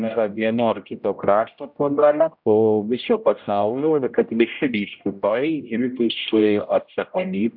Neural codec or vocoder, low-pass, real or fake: codec, 16 kHz, 0.5 kbps, X-Codec, HuBERT features, trained on general audio; 5.4 kHz; fake